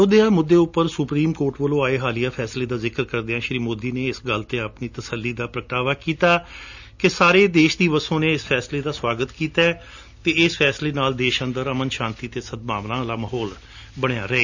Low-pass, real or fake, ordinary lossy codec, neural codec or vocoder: 7.2 kHz; real; none; none